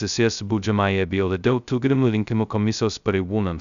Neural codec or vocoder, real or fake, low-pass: codec, 16 kHz, 0.2 kbps, FocalCodec; fake; 7.2 kHz